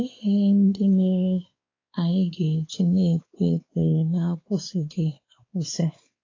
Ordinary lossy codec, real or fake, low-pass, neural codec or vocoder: AAC, 32 kbps; fake; 7.2 kHz; codec, 16 kHz, 4 kbps, X-Codec, WavLM features, trained on Multilingual LibriSpeech